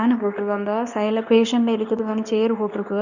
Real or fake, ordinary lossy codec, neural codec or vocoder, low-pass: fake; none; codec, 24 kHz, 0.9 kbps, WavTokenizer, medium speech release version 2; 7.2 kHz